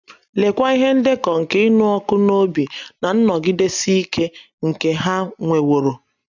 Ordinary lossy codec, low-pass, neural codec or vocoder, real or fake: none; 7.2 kHz; none; real